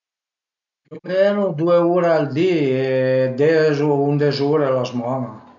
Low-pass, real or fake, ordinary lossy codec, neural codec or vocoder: 7.2 kHz; real; none; none